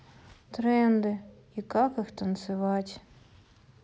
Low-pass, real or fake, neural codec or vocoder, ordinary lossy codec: none; real; none; none